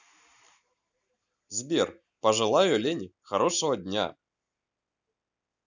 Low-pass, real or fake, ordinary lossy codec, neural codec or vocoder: 7.2 kHz; real; none; none